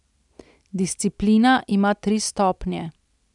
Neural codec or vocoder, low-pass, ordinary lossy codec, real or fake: none; 10.8 kHz; none; real